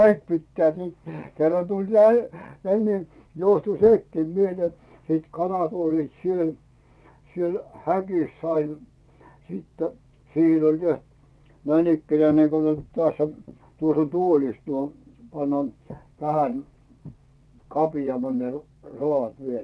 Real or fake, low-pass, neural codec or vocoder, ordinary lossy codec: fake; none; vocoder, 22.05 kHz, 80 mel bands, WaveNeXt; none